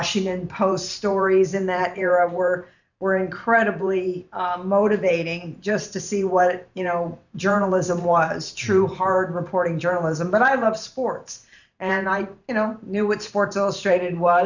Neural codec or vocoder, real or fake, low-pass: vocoder, 44.1 kHz, 128 mel bands every 512 samples, BigVGAN v2; fake; 7.2 kHz